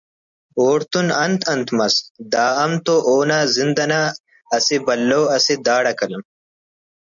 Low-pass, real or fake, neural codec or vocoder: 7.2 kHz; real; none